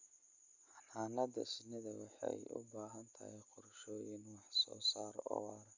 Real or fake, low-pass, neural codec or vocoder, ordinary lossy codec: real; 7.2 kHz; none; Opus, 64 kbps